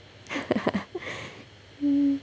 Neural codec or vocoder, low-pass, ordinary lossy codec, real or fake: none; none; none; real